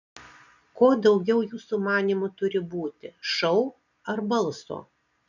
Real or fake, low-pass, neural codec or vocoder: real; 7.2 kHz; none